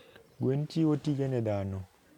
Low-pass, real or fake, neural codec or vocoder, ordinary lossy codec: 19.8 kHz; real; none; none